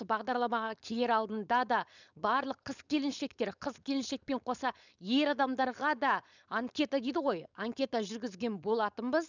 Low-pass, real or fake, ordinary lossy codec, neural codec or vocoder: 7.2 kHz; fake; none; codec, 16 kHz, 4.8 kbps, FACodec